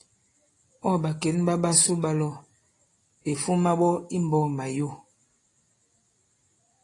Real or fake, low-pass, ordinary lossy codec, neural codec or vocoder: real; 10.8 kHz; AAC, 32 kbps; none